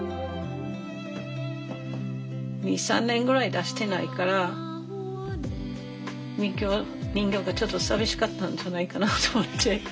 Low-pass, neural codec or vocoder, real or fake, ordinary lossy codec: none; none; real; none